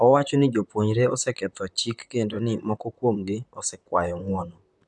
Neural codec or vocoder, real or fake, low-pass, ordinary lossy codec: vocoder, 44.1 kHz, 128 mel bands, Pupu-Vocoder; fake; 10.8 kHz; none